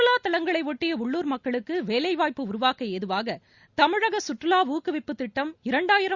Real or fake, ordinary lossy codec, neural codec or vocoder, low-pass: real; Opus, 64 kbps; none; 7.2 kHz